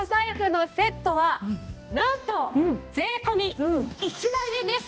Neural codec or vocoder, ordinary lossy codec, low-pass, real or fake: codec, 16 kHz, 1 kbps, X-Codec, HuBERT features, trained on balanced general audio; none; none; fake